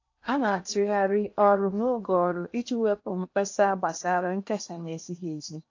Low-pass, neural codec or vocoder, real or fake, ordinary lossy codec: 7.2 kHz; codec, 16 kHz in and 24 kHz out, 0.8 kbps, FocalCodec, streaming, 65536 codes; fake; AAC, 48 kbps